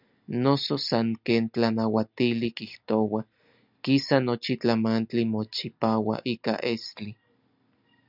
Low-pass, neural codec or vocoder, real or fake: 5.4 kHz; none; real